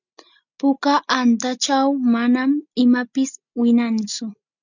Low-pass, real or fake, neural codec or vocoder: 7.2 kHz; real; none